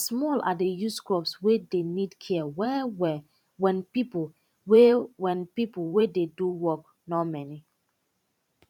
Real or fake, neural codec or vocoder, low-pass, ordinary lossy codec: fake; vocoder, 44.1 kHz, 128 mel bands every 512 samples, BigVGAN v2; 19.8 kHz; none